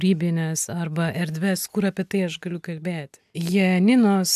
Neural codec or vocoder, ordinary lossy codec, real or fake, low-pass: none; AAC, 96 kbps; real; 14.4 kHz